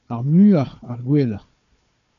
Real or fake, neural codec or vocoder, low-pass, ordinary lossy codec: fake; codec, 16 kHz, 4 kbps, FunCodec, trained on Chinese and English, 50 frames a second; 7.2 kHz; AAC, 96 kbps